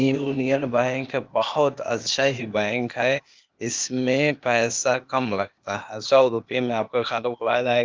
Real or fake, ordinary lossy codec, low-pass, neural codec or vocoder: fake; Opus, 32 kbps; 7.2 kHz; codec, 16 kHz, 0.8 kbps, ZipCodec